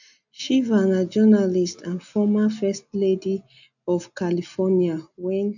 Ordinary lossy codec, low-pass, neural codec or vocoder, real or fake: none; 7.2 kHz; none; real